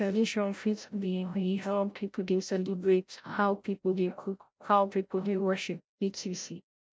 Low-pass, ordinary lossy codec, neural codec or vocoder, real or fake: none; none; codec, 16 kHz, 0.5 kbps, FreqCodec, larger model; fake